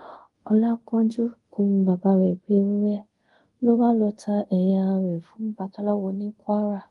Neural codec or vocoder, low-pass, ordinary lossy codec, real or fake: codec, 24 kHz, 0.5 kbps, DualCodec; 10.8 kHz; Opus, 24 kbps; fake